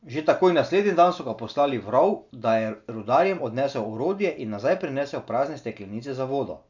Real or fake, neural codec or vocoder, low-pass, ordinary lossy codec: real; none; 7.2 kHz; none